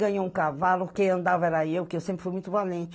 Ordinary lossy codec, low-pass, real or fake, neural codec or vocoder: none; none; real; none